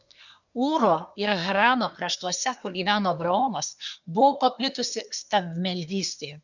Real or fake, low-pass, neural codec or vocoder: fake; 7.2 kHz; codec, 24 kHz, 1 kbps, SNAC